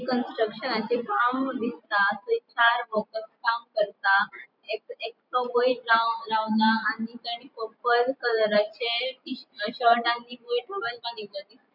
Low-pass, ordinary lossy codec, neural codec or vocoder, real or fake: 5.4 kHz; none; vocoder, 44.1 kHz, 128 mel bands every 512 samples, BigVGAN v2; fake